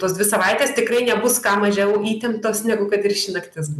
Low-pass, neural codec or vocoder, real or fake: 14.4 kHz; none; real